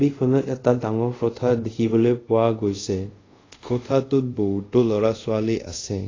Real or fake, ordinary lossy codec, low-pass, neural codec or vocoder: fake; AAC, 32 kbps; 7.2 kHz; codec, 24 kHz, 0.5 kbps, DualCodec